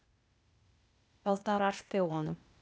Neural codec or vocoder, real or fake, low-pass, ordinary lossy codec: codec, 16 kHz, 0.8 kbps, ZipCodec; fake; none; none